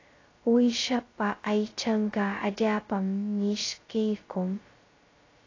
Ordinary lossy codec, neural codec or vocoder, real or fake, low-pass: AAC, 32 kbps; codec, 16 kHz, 0.2 kbps, FocalCodec; fake; 7.2 kHz